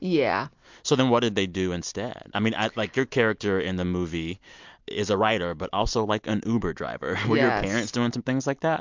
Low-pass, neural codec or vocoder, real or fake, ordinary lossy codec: 7.2 kHz; none; real; MP3, 64 kbps